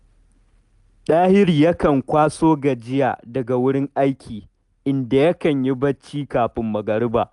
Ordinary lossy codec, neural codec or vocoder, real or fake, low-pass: none; none; real; 10.8 kHz